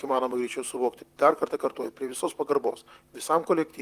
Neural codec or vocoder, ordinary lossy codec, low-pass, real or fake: vocoder, 44.1 kHz, 128 mel bands, Pupu-Vocoder; Opus, 32 kbps; 14.4 kHz; fake